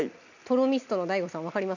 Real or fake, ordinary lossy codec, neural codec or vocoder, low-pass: real; none; none; 7.2 kHz